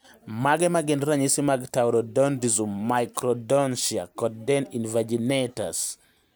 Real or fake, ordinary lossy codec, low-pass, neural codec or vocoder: real; none; none; none